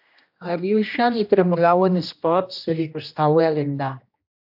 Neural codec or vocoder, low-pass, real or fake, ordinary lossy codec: codec, 16 kHz, 1 kbps, X-Codec, HuBERT features, trained on general audio; 5.4 kHz; fake; AAC, 48 kbps